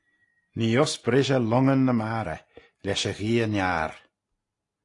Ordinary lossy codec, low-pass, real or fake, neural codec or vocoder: AAC, 48 kbps; 10.8 kHz; real; none